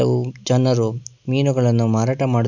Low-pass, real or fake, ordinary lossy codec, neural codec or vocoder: 7.2 kHz; real; none; none